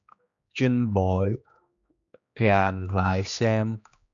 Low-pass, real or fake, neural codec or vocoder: 7.2 kHz; fake; codec, 16 kHz, 2 kbps, X-Codec, HuBERT features, trained on general audio